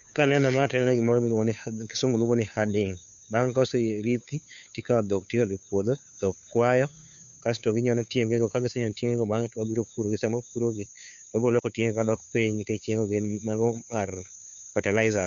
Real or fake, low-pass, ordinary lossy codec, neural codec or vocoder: fake; 7.2 kHz; none; codec, 16 kHz, 2 kbps, FunCodec, trained on Chinese and English, 25 frames a second